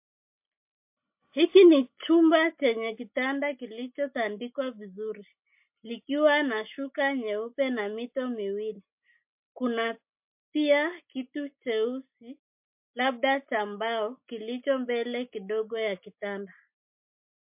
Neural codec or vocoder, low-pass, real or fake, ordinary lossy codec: none; 3.6 kHz; real; MP3, 32 kbps